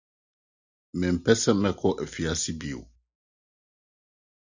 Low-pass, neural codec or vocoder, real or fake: 7.2 kHz; none; real